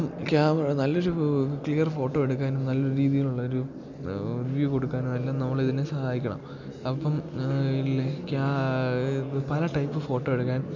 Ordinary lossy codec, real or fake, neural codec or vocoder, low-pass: none; real; none; 7.2 kHz